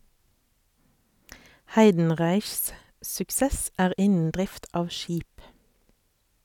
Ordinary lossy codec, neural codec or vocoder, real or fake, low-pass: none; none; real; 19.8 kHz